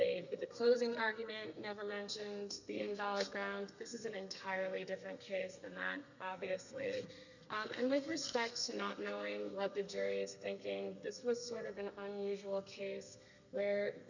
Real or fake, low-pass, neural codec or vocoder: fake; 7.2 kHz; codec, 32 kHz, 1.9 kbps, SNAC